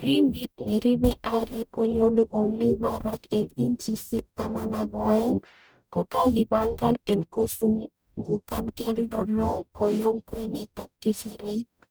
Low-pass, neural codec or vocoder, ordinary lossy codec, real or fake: none; codec, 44.1 kHz, 0.9 kbps, DAC; none; fake